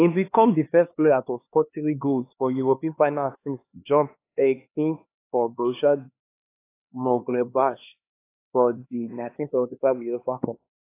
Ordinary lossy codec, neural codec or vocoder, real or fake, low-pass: AAC, 24 kbps; codec, 16 kHz, 2 kbps, X-Codec, HuBERT features, trained on LibriSpeech; fake; 3.6 kHz